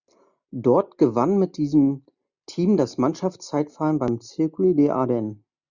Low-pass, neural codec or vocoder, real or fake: 7.2 kHz; none; real